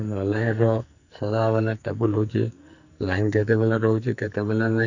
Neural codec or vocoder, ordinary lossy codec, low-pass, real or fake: codec, 44.1 kHz, 2.6 kbps, SNAC; none; 7.2 kHz; fake